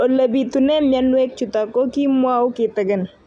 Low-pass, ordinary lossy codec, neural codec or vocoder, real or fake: 10.8 kHz; none; none; real